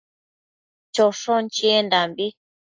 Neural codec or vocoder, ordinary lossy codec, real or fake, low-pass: none; AAC, 48 kbps; real; 7.2 kHz